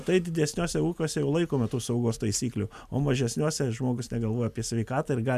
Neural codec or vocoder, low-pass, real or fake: none; 14.4 kHz; real